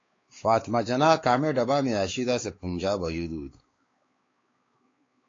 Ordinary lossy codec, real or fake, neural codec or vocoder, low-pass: AAC, 32 kbps; fake; codec, 16 kHz, 4 kbps, X-Codec, WavLM features, trained on Multilingual LibriSpeech; 7.2 kHz